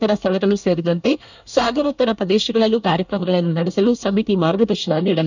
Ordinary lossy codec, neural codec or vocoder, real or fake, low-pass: none; codec, 24 kHz, 1 kbps, SNAC; fake; 7.2 kHz